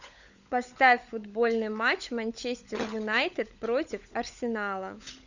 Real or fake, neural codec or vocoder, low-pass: fake; codec, 16 kHz, 16 kbps, FunCodec, trained on LibriTTS, 50 frames a second; 7.2 kHz